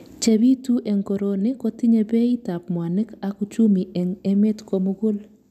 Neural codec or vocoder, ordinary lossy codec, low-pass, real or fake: none; none; 14.4 kHz; real